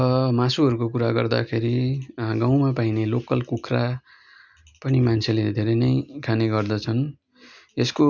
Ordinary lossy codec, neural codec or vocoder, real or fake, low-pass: none; none; real; 7.2 kHz